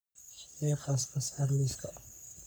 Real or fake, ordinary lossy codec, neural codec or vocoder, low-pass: fake; none; codec, 44.1 kHz, 3.4 kbps, Pupu-Codec; none